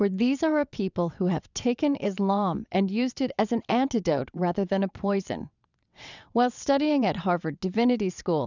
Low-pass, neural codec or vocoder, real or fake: 7.2 kHz; vocoder, 22.05 kHz, 80 mel bands, WaveNeXt; fake